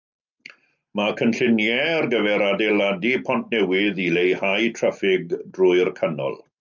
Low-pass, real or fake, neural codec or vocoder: 7.2 kHz; real; none